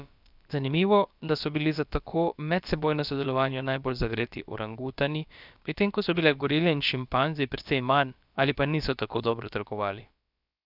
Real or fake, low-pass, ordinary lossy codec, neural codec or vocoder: fake; 5.4 kHz; none; codec, 16 kHz, about 1 kbps, DyCAST, with the encoder's durations